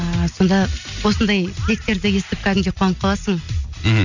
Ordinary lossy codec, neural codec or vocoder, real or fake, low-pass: none; none; real; 7.2 kHz